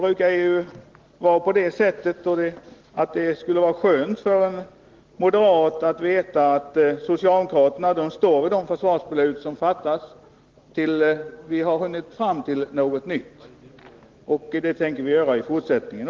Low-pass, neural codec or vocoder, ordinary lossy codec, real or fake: 7.2 kHz; none; Opus, 16 kbps; real